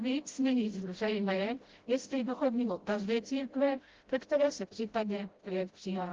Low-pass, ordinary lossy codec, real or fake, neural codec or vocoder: 7.2 kHz; Opus, 16 kbps; fake; codec, 16 kHz, 0.5 kbps, FreqCodec, smaller model